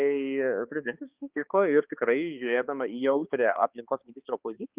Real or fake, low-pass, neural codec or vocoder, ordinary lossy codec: fake; 3.6 kHz; codec, 16 kHz, 2 kbps, X-Codec, HuBERT features, trained on balanced general audio; Opus, 32 kbps